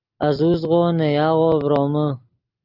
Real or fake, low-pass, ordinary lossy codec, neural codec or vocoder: real; 5.4 kHz; Opus, 32 kbps; none